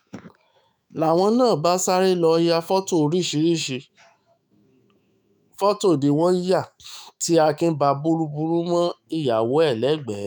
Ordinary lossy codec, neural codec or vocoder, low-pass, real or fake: none; autoencoder, 48 kHz, 128 numbers a frame, DAC-VAE, trained on Japanese speech; none; fake